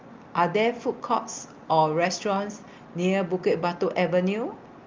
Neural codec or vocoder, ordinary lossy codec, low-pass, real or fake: none; Opus, 24 kbps; 7.2 kHz; real